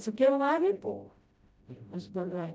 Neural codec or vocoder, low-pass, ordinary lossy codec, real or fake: codec, 16 kHz, 0.5 kbps, FreqCodec, smaller model; none; none; fake